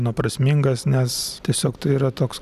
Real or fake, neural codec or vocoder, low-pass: real; none; 14.4 kHz